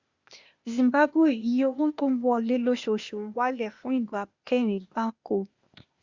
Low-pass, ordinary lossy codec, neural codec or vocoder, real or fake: 7.2 kHz; Opus, 64 kbps; codec, 16 kHz, 0.8 kbps, ZipCodec; fake